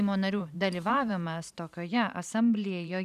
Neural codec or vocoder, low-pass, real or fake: vocoder, 44.1 kHz, 128 mel bands every 512 samples, BigVGAN v2; 14.4 kHz; fake